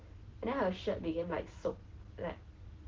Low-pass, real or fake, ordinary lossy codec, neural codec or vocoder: 7.2 kHz; real; Opus, 16 kbps; none